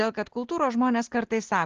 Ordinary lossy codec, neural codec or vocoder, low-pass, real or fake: Opus, 16 kbps; none; 7.2 kHz; real